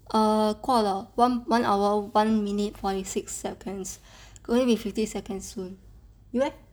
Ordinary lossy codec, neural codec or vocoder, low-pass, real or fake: none; none; none; real